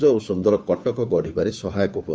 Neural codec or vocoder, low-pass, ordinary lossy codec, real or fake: codec, 16 kHz, 2 kbps, FunCodec, trained on Chinese and English, 25 frames a second; none; none; fake